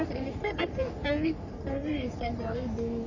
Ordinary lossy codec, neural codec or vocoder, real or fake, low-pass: none; codec, 44.1 kHz, 3.4 kbps, Pupu-Codec; fake; 7.2 kHz